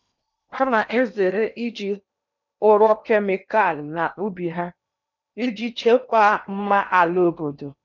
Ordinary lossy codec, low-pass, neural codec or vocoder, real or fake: none; 7.2 kHz; codec, 16 kHz in and 24 kHz out, 0.8 kbps, FocalCodec, streaming, 65536 codes; fake